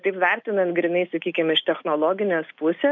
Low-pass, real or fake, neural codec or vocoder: 7.2 kHz; real; none